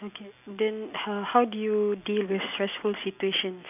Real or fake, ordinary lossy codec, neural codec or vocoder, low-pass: real; AAC, 32 kbps; none; 3.6 kHz